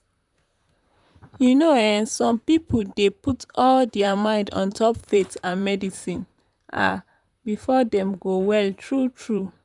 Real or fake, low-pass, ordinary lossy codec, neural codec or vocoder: fake; 10.8 kHz; none; vocoder, 44.1 kHz, 128 mel bands, Pupu-Vocoder